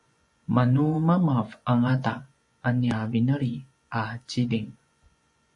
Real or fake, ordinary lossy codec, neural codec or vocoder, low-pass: fake; MP3, 48 kbps; vocoder, 24 kHz, 100 mel bands, Vocos; 10.8 kHz